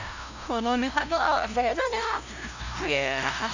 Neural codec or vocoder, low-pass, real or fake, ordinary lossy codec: codec, 16 kHz, 0.5 kbps, FunCodec, trained on LibriTTS, 25 frames a second; 7.2 kHz; fake; none